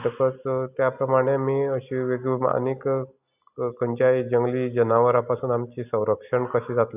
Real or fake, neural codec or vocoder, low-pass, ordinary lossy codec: real; none; 3.6 kHz; none